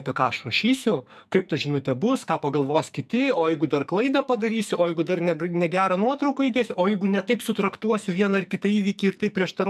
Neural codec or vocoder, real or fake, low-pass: codec, 44.1 kHz, 2.6 kbps, SNAC; fake; 14.4 kHz